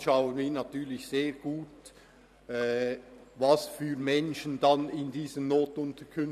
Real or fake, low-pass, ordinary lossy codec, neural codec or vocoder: fake; 14.4 kHz; none; vocoder, 44.1 kHz, 128 mel bands every 512 samples, BigVGAN v2